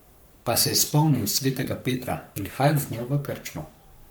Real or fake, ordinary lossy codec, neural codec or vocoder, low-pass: fake; none; codec, 44.1 kHz, 3.4 kbps, Pupu-Codec; none